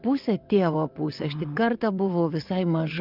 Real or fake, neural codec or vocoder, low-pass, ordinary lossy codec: fake; vocoder, 22.05 kHz, 80 mel bands, WaveNeXt; 5.4 kHz; Opus, 24 kbps